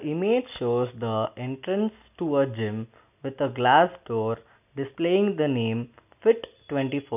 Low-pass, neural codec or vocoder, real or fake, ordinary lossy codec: 3.6 kHz; none; real; none